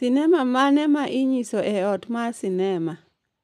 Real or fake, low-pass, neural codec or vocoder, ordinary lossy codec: real; 14.4 kHz; none; none